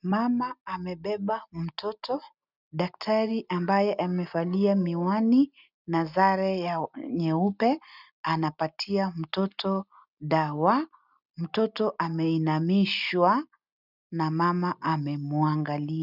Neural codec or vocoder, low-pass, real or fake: none; 5.4 kHz; real